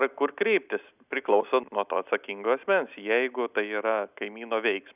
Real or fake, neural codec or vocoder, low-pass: real; none; 3.6 kHz